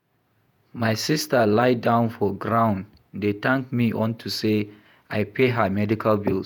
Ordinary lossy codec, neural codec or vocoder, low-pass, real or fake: none; vocoder, 48 kHz, 128 mel bands, Vocos; none; fake